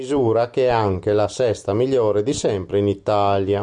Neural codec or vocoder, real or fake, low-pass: none; real; 10.8 kHz